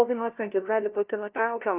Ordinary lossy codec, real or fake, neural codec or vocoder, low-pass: Opus, 24 kbps; fake; codec, 16 kHz, 0.5 kbps, FunCodec, trained on LibriTTS, 25 frames a second; 3.6 kHz